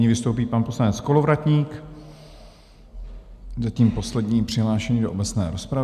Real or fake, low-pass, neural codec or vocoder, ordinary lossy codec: real; 14.4 kHz; none; AAC, 96 kbps